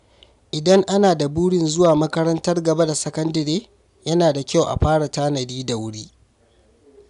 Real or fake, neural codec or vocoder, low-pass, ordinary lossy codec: real; none; 10.8 kHz; none